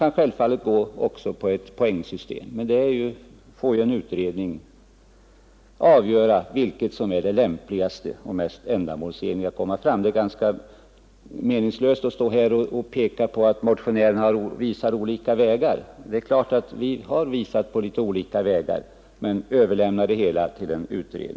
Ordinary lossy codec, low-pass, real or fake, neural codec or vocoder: none; none; real; none